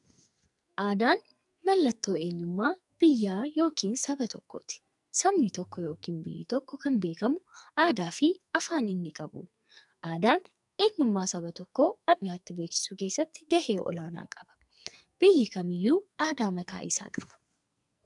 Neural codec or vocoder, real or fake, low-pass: codec, 44.1 kHz, 2.6 kbps, SNAC; fake; 10.8 kHz